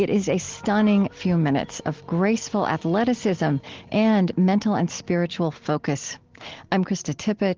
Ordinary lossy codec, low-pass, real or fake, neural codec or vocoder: Opus, 16 kbps; 7.2 kHz; real; none